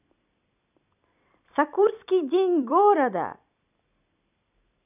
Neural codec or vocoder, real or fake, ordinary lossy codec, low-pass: vocoder, 44.1 kHz, 128 mel bands every 256 samples, BigVGAN v2; fake; none; 3.6 kHz